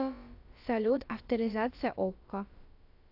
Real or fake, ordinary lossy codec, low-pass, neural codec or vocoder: fake; AAC, 48 kbps; 5.4 kHz; codec, 16 kHz, about 1 kbps, DyCAST, with the encoder's durations